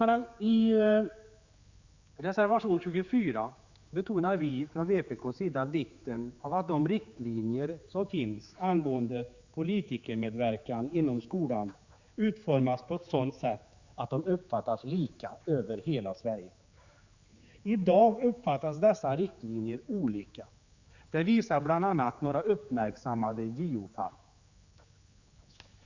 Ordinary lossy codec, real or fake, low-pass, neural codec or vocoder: Opus, 64 kbps; fake; 7.2 kHz; codec, 16 kHz, 4 kbps, X-Codec, HuBERT features, trained on general audio